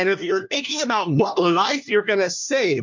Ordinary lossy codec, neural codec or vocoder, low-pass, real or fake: MP3, 48 kbps; codec, 16 kHz, 1 kbps, FunCodec, trained on LibriTTS, 50 frames a second; 7.2 kHz; fake